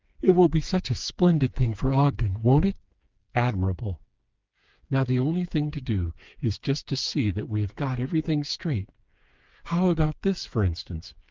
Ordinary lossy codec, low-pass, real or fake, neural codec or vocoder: Opus, 32 kbps; 7.2 kHz; fake; codec, 16 kHz, 4 kbps, FreqCodec, smaller model